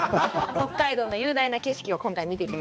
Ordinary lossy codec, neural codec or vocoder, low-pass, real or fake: none; codec, 16 kHz, 2 kbps, X-Codec, HuBERT features, trained on balanced general audio; none; fake